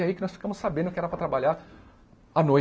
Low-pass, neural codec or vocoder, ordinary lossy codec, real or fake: none; none; none; real